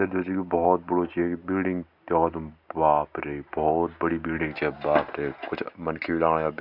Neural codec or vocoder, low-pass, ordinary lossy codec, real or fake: none; 5.4 kHz; none; real